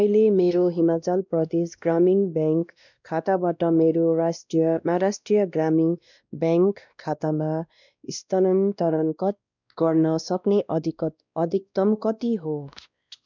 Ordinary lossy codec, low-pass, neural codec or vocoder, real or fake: none; 7.2 kHz; codec, 16 kHz, 1 kbps, X-Codec, WavLM features, trained on Multilingual LibriSpeech; fake